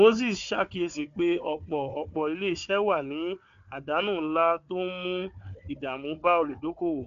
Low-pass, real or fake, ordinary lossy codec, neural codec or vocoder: 7.2 kHz; fake; AAC, 64 kbps; codec, 16 kHz, 4 kbps, FunCodec, trained on Chinese and English, 50 frames a second